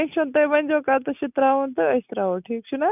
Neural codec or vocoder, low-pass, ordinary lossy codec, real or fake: none; 3.6 kHz; none; real